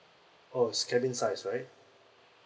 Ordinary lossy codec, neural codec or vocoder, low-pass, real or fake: none; none; none; real